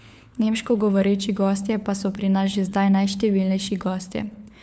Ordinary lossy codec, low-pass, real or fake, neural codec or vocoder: none; none; fake; codec, 16 kHz, 4 kbps, FunCodec, trained on LibriTTS, 50 frames a second